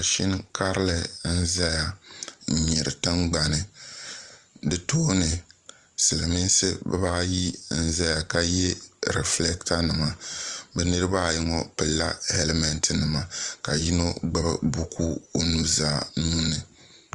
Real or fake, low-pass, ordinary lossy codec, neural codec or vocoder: fake; 10.8 kHz; Opus, 32 kbps; vocoder, 44.1 kHz, 128 mel bands every 512 samples, BigVGAN v2